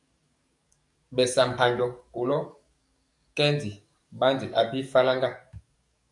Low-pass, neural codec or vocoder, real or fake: 10.8 kHz; codec, 44.1 kHz, 7.8 kbps, DAC; fake